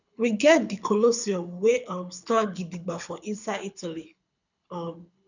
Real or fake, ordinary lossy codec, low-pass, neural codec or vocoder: fake; none; 7.2 kHz; codec, 24 kHz, 6 kbps, HILCodec